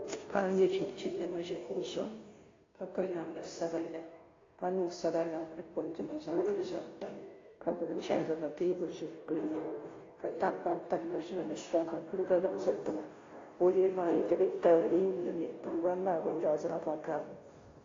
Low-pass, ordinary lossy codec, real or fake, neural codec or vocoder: 7.2 kHz; AAC, 32 kbps; fake; codec, 16 kHz, 0.5 kbps, FunCodec, trained on Chinese and English, 25 frames a second